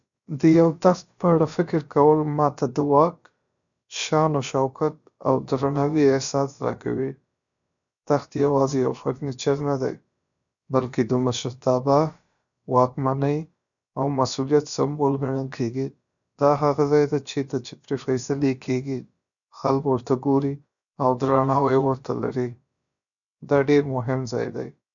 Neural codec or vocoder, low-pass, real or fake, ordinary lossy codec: codec, 16 kHz, about 1 kbps, DyCAST, with the encoder's durations; 7.2 kHz; fake; none